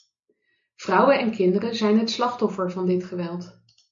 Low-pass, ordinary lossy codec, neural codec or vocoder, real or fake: 7.2 kHz; MP3, 48 kbps; none; real